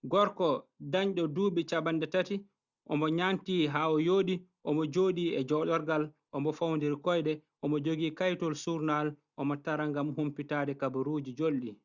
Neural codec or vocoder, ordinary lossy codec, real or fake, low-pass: none; Opus, 64 kbps; real; 7.2 kHz